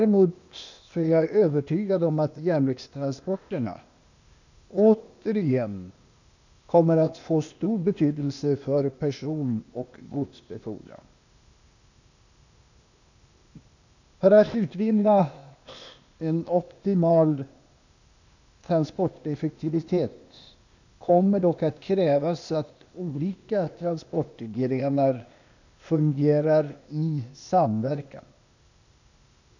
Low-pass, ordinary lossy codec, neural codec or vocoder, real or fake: 7.2 kHz; none; codec, 16 kHz, 0.8 kbps, ZipCodec; fake